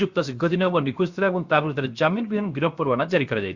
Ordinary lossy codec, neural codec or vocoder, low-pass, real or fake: Opus, 64 kbps; codec, 16 kHz, 0.7 kbps, FocalCodec; 7.2 kHz; fake